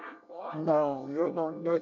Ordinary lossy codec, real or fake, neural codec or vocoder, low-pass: none; fake; codec, 24 kHz, 1 kbps, SNAC; 7.2 kHz